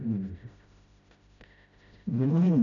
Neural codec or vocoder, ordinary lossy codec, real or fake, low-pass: codec, 16 kHz, 0.5 kbps, FreqCodec, smaller model; none; fake; 7.2 kHz